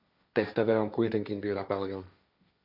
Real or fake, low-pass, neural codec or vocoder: fake; 5.4 kHz; codec, 16 kHz, 1.1 kbps, Voila-Tokenizer